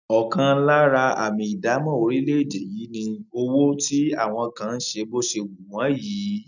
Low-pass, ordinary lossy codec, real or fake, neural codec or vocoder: 7.2 kHz; none; real; none